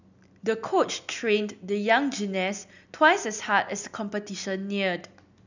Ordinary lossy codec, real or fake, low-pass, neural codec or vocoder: none; real; 7.2 kHz; none